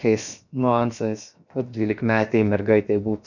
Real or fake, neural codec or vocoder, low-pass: fake; codec, 16 kHz, 0.7 kbps, FocalCodec; 7.2 kHz